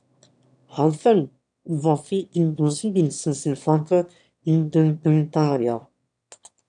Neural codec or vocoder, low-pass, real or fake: autoencoder, 22.05 kHz, a latent of 192 numbers a frame, VITS, trained on one speaker; 9.9 kHz; fake